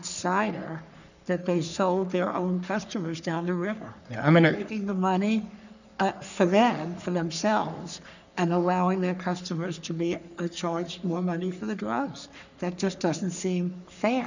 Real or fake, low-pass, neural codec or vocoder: fake; 7.2 kHz; codec, 44.1 kHz, 3.4 kbps, Pupu-Codec